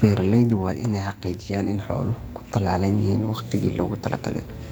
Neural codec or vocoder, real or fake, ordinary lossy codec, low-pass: codec, 44.1 kHz, 2.6 kbps, SNAC; fake; none; none